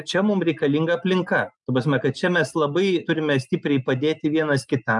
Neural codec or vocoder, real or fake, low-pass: none; real; 10.8 kHz